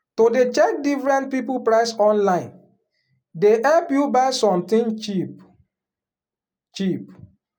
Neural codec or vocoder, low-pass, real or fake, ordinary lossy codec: none; 19.8 kHz; real; none